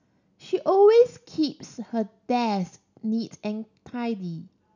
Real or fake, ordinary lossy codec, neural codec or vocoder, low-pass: real; none; none; 7.2 kHz